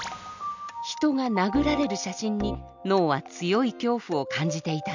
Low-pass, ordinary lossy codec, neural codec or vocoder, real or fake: 7.2 kHz; none; none; real